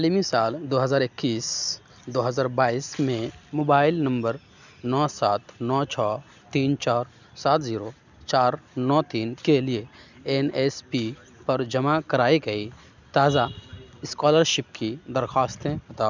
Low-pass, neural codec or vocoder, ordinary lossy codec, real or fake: 7.2 kHz; none; none; real